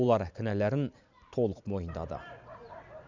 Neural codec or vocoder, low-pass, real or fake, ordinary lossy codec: vocoder, 44.1 kHz, 128 mel bands every 512 samples, BigVGAN v2; 7.2 kHz; fake; none